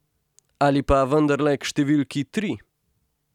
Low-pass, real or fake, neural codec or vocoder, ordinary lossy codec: 19.8 kHz; real; none; none